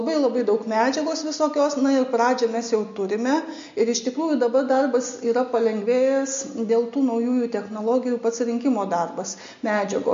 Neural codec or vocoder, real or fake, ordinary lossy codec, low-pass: none; real; MP3, 48 kbps; 7.2 kHz